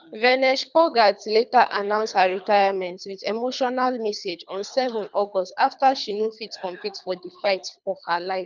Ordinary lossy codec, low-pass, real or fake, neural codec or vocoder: none; 7.2 kHz; fake; codec, 24 kHz, 3 kbps, HILCodec